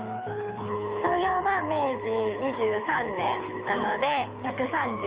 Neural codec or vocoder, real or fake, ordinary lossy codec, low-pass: codec, 24 kHz, 6 kbps, HILCodec; fake; Opus, 24 kbps; 3.6 kHz